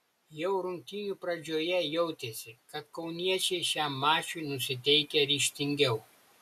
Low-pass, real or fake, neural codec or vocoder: 14.4 kHz; real; none